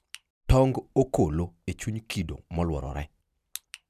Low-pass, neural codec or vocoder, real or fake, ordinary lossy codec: 14.4 kHz; none; real; none